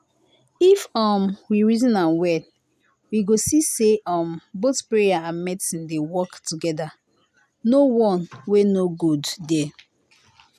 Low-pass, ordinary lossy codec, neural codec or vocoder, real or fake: 14.4 kHz; none; none; real